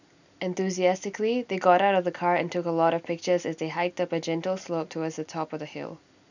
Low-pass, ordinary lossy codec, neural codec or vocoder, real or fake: 7.2 kHz; none; none; real